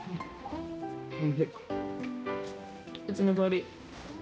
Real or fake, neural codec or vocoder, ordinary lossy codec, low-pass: fake; codec, 16 kHz, 1 kbps, X-Codec, HuBERT features, trained on balanced general audio; none; none